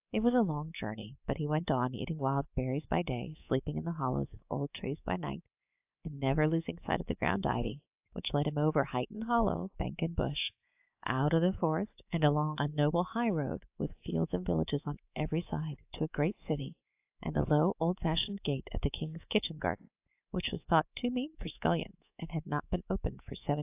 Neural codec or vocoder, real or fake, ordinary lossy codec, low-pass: codec, 24 kHz, 3.1 kbps, DualCodec; fake; AAC, 32 kbps; 3.6 kHz